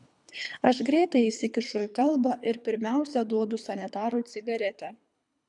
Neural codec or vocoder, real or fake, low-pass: codec, 24 kHz, 3 kbps, HILCodec; fake; 10.8 kHz